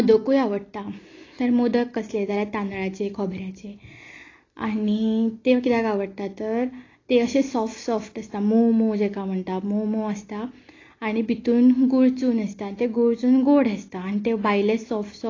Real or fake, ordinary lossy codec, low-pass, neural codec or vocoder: real; AAC, 32 kbps; 7.2 kHz; none